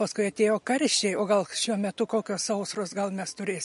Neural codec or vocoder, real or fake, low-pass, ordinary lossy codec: none; real; 14.4 kHz; MP3, 48 kbps